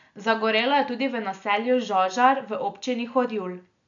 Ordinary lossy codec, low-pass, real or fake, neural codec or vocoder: none; 7.2 kHz; real; none